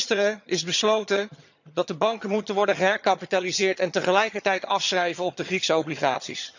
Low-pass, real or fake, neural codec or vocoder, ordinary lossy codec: 7.2 kHz; fake; vocoder, 22.05 kHz, 80 mel bands, HiFi-GAN; none